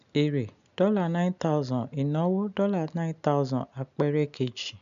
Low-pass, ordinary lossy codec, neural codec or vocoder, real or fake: 7.2 kHz; none; none; real